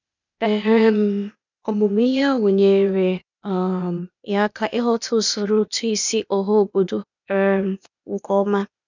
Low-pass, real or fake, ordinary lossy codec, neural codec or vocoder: 7.2 kHz; fake; none; codec, 16 kHz, 0.8 kbps, ZipCodec